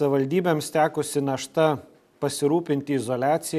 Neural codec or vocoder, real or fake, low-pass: none; real; 14.4 kHz